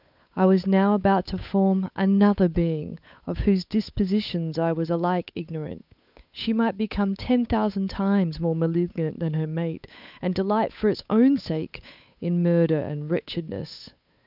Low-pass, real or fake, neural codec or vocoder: 5.4 kHz; fake; codec, 16 kHz, 8 kbps, FunCodec, trained on Chinese and English, 25 frames a second